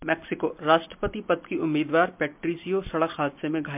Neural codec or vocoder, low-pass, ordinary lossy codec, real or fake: none; 3.6 kHz; MP3, 32 kbps; real